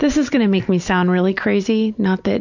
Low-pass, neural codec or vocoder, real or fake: 7.2 kHz; none; real